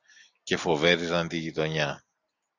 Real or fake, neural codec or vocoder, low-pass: real; none; 7.2 kHz